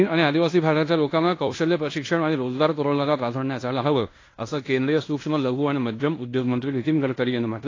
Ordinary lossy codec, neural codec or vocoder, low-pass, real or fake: AAC, 32 kbps; codec, 16 kHz in and 24 kHz out, 0.9 kbps, LongCat-Audio-Codec, fine tuned four codebook decoder; 7.2 kHz; fake